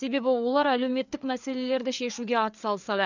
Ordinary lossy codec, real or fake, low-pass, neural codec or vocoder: none; fake; 7.2 kHz; codec, 16 kHz, 4 kbps, FreqCodec, larger model